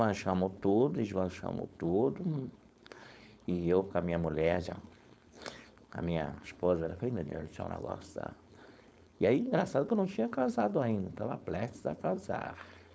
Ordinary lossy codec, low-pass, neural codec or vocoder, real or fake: none; none; codec, 16 kHz, 4.8 kbps, FACodec; fake